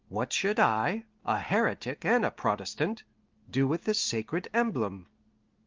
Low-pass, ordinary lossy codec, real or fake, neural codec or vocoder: 7.2 kHz; Opus, 32 kbps; real; none